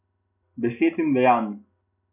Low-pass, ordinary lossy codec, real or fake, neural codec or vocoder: 3.6 kHz; none; real; none